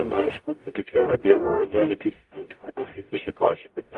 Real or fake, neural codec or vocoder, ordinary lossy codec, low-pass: fake; codec, 44.1 kHz, 0.9 kbps, DAC; Opus, 32 kbps; 10.8 kHz